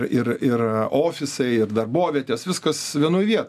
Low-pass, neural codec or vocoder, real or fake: 14.4 kHz; none; real